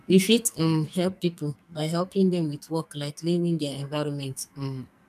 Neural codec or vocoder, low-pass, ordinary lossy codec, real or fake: codec, 44.1 kHz, 2.6 kbps, SNAC; 14.4 kHz; none; fake